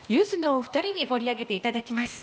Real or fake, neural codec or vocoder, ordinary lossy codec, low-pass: fake; codec, 16 kHz, 0.8 kbps, ZipCodec; none; none